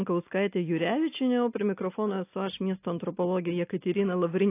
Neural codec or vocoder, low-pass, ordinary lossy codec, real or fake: none; 3.6 kHz; AAC, 24 kbps; real